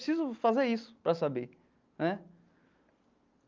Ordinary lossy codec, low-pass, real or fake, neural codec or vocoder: Opus, 32 kbps; 7.2 kHz; real; none